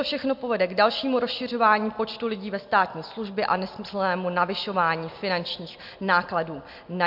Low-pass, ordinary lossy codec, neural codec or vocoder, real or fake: 5.4 kHz; MP3, 48 kbps; none; real